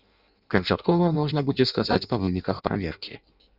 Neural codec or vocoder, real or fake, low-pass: codec, 16 kHz in and 24 kHz out, 0.6 kbps, FireRedTTS-2 codec; fake; 5.4 kHz